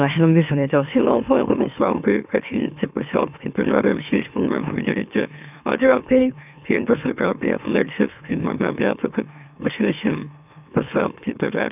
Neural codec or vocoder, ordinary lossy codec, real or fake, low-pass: autoencoder, 44.1 kHz, a latent of 192 numbers a frame, MeloTTS; none; fake; 3.6 kHz